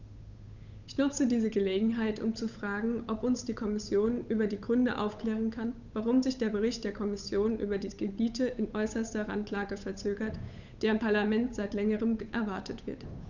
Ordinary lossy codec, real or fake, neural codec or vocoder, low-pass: none; fake; codec, 16 kHz, 8 kbps, FunCodec, trained on Chinese and English, 25 frames a second; 7.2 kHz